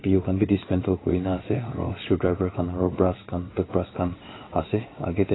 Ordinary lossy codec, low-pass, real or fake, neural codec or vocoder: AAC, 16 kbps; 7.2 kHz; fake; vocoder, 22.05 kHz, 80 mel bands, WaveNeXt